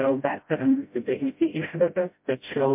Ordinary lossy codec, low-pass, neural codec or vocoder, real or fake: MP3, 32 kbps; 3.6 kHz; codec, 16 kHz, 0.5 kbps, FreqCodec, smaller model; fake